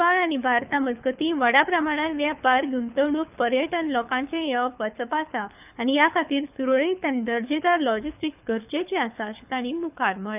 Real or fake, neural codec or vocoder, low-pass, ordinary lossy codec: fake; codec, 24 kHz, 6 kbps, HILCodec; 3.6 kHz; none